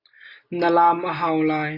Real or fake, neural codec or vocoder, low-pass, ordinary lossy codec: real; none; 5.4 kHz; AAC, 48 kbps